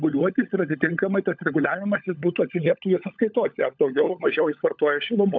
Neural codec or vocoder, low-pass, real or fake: codec, 16 kHz, 16 kbps, FunCodec, trained on LibriTTS, 50 frames a second; 7.2 kHz; fake